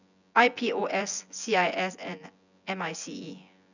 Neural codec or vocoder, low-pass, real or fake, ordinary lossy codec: vocoder, 24 kHz, 100 mel bands, Vocos; 7.2 kHz; fake; none